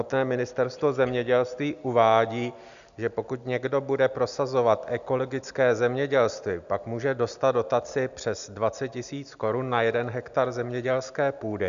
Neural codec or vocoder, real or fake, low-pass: none; real; 7.2 kHz